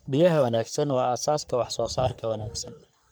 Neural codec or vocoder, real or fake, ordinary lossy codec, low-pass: codec, 44.1 kHz, 3.4 kbps, Pupu-Codec; fake; none; none